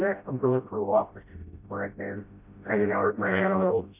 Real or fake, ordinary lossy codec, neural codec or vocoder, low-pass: fake; MP3, 32 kbps; codec, 16 kHz, 0.5 kbps, FreqCodec, smaller model; 3.6 kHz